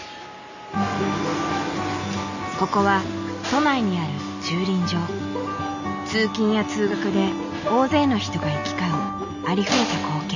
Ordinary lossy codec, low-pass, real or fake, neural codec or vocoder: none; 7.2 kHz; real; none